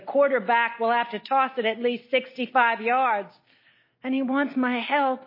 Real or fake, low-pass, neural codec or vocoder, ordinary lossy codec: real; 5.4 kHz; none; MP3, 24 kbps